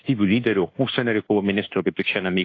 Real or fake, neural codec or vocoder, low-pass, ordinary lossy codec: fake; codec, 24 kHz, 1.2 kbps, DualCodec; 7.2 kHz; AAC, 32 kbps